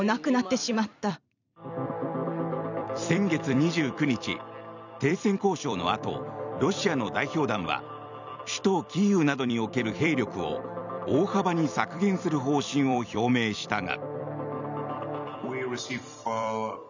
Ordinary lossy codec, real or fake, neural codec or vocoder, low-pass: none; fake; vocoder, 44.1 kHz, 128 mel bands every 256 samples, BigVGAN v2; 7.2 kHz